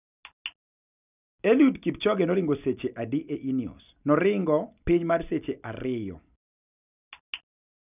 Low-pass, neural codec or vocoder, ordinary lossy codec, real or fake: 3.6 kHz; none; none; real